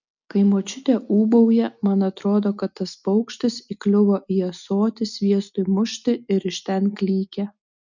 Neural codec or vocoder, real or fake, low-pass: none; real; 7.2 kHz